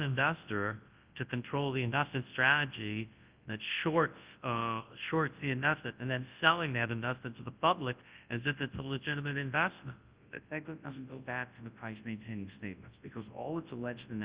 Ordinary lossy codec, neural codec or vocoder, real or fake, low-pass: Opus, 32 kbps; codec, 24 kHz, 0.9 kbps, WavTokenizer, large speech release; fake; 3.6 kHz